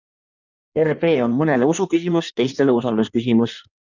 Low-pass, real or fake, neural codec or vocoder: 7.2 kHz; fake; codec, 16 kHz in and 24 kHz out, 1.1 kbps, FireRedTTS-2 codec